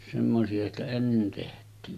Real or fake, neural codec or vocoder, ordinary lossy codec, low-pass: real; none; none; 14.4 kHz